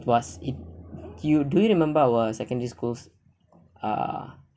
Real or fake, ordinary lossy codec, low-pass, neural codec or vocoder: real; none; none; none